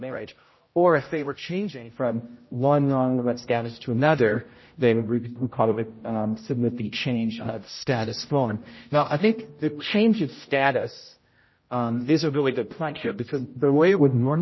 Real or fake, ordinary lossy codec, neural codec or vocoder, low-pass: fake; MP3, 24 kbps; codec, 16 kHz, 0.5 kbps, X-Codec, HuBERT features, trained on general audio; 7.2 kHz